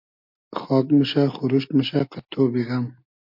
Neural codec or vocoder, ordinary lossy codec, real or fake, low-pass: none; MP3, 48 kbps; real; 5.4 kHz